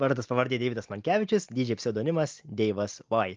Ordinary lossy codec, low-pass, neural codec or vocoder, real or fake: Opus, 24 kbps; 7.2 kHz; none; real